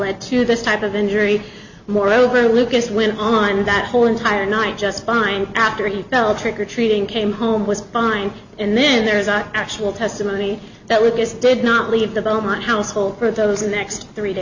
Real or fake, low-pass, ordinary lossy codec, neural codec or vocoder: real; 7.2 kHz; Opus, 64 kbps; none